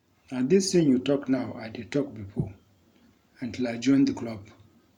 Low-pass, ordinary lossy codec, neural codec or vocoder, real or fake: 19.8 kHz; none; vocoder, 44.1 kHz, 128 mel bands every 512 samples, BigVGAN v2; fake